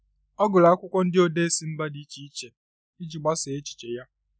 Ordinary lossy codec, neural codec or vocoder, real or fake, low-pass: none; none; real; none